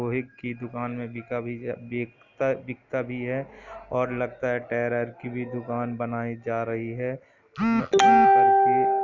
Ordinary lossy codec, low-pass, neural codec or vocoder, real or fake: none; 7.2 kHz; none; real